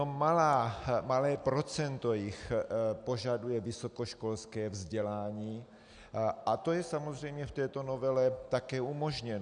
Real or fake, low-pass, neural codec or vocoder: real; 9.9 kHz; none